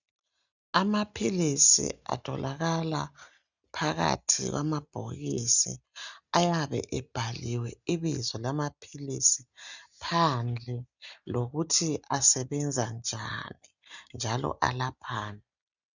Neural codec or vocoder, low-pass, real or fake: none; 7.2 kHz; real